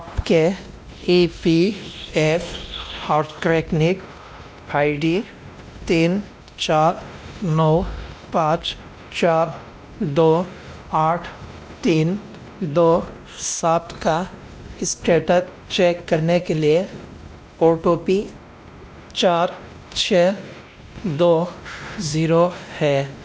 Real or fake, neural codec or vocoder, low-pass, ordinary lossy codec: fake; codec, 16 kHz, 1 kbps, X-Codec, WavLM features, trained on Multilingual LibriSpeech; none; none